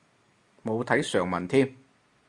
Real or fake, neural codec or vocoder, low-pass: real; none; 10.8 kHz